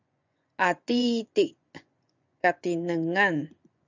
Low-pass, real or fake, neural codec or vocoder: 7.2 kHz; fake; vocoder, 24 kHz, 100 mel bands, Vocos